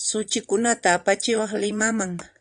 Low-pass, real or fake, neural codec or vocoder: 9.9 kHz; fake; vocoder, 22.05 kHz, 80 mel bands, Vocos